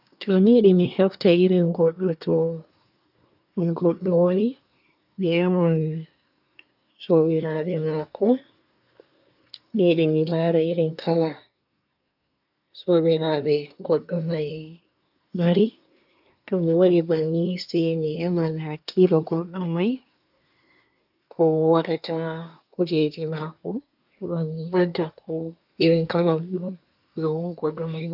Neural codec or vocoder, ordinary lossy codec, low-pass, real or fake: codec, 24 kHz, 1 kbps, SNAC; none; 5.4 kHz; fake